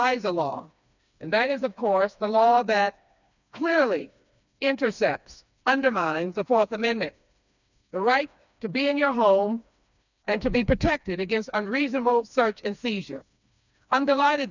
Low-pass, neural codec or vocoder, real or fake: 7.2 kHz; codec, 16 kHz, 2 kbps, FreqCodec, smaller model; fake